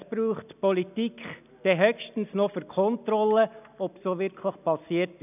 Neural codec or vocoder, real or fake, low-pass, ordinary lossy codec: none; real; 3.6 kHz; none